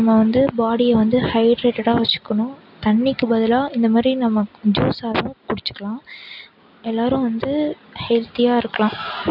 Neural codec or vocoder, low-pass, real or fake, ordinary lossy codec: none; 5.4 kHz; real; none